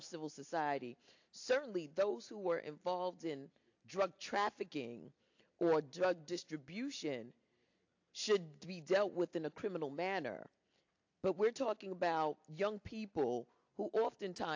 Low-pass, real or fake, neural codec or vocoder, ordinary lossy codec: 7.2 kHz; real; none; AAC, 48 kbps